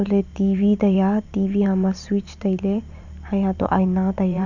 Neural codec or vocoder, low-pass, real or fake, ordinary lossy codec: vocoder, 44.1 kHz, 128 mel bands every 512 samples, BigVGAN v2; 7.2 kHz; fake; none